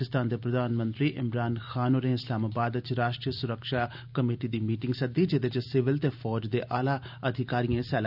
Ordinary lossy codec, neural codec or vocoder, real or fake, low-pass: none; none; real; 5.4 kHz